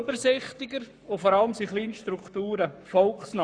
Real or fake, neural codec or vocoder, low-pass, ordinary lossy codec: fake; codec, 44.1 kHz, 7.8 kbps, Pupu-Codec; 9.9 kHz; none